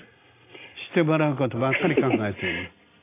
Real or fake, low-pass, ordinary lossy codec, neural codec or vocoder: real; 3.6 kHz; AAC, 24 kbps; none